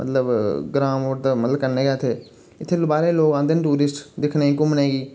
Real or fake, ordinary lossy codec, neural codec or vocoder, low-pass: real; none; none; none